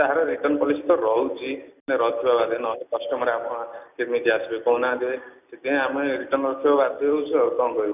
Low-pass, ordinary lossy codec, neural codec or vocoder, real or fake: 3.6 kHz; none; none; real